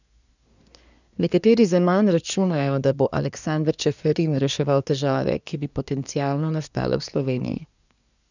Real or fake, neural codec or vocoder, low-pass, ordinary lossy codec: fake; codec, 24 kHz, 1 kbps, SNAC; 7.2 kHz; none